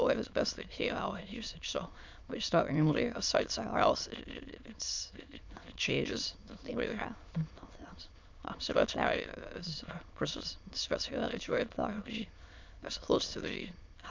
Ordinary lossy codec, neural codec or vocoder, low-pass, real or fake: MP3, 64 kbps; autoencoder, 22.05 kHz, a latent of 192 numbers a frame, VITS, trained on many speakers; 7.2 kHz; fake